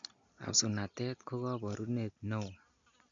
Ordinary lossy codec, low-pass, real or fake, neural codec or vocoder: MP3, 64 kbps; 7.2 kHz; real; none